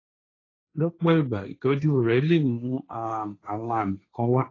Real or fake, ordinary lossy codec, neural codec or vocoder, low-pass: fake; AAC, 32 kbps; codec, 16 kHz, 1.1 kbps, Voila-Tokenizer; 7.2 kHz